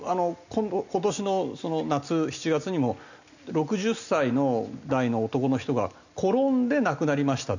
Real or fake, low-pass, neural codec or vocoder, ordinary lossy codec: real; 7.2 kHz; none; none